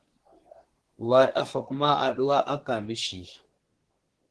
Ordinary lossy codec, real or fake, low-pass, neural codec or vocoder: Opus, 16 kbps; fake; 10.8 kHz; codec, 24 kHz, 1 kbps, SNAC